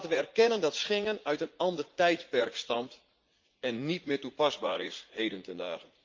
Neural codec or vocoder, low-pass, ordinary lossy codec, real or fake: vocoder, 44.1 kHz, 80 mel bands, Vocos; 7.2 kHz; Opus, 32 kbps; fake